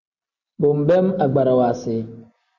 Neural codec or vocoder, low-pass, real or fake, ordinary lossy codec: none; 7.2 kHz; real; MP3, 64 kbps